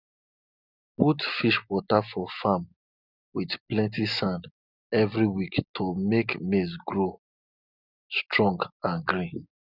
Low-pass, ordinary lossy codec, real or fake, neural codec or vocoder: 5.4 kHz; none; real; none